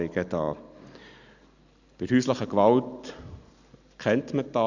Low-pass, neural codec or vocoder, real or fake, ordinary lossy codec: 7.2 kHz; none; real; none